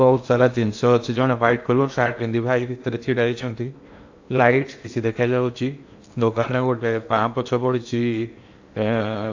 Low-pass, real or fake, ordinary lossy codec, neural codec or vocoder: 7.2 kHz; fake; AAC, 48 kbps; codec, 16 kHz in and 24 kHz out, 0.8 kbps, FocalCodec, streaming, 65536 codes